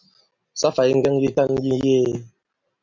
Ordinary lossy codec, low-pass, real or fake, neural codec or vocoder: MP3, 64 kbps; 7.2 kHz; real; none